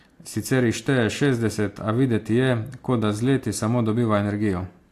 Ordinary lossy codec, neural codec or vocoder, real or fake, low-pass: AAC, 64 kbps; none; real; 14.4 kHz